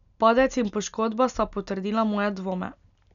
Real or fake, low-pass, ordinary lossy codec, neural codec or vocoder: real; 7.2 kHz; none; none